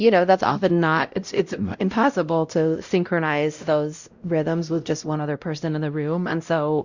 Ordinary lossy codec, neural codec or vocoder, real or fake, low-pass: Opus, 64 kbps; codec, 16 kHz, 0.5 kbps, X-Codec, WavLM features, trained on Multilingual LibriSpeech; fake; 7.2 kHz